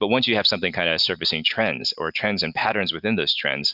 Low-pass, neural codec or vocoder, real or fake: 5.4 kHz; none; real